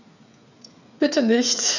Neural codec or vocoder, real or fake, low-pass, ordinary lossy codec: codec, 16 kHz, 8 kbps, FreqCodec, smaller model; fake; 7.2 kHz; none